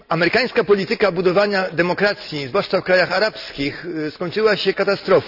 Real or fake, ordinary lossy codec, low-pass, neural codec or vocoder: real; none; 5.4 kHz; none